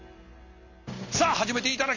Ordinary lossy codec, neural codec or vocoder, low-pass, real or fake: none; none; 7.2 kHz; real